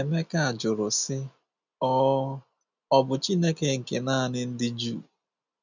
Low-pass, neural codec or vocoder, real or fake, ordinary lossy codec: 7.2 kHz; none; real; none